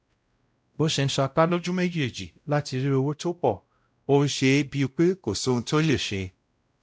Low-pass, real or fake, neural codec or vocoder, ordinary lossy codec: none; fake; codec, 16 kHz, 0.5 kbps, X-Codec, WavLM features, trained on Multilingual LibriSpeech; none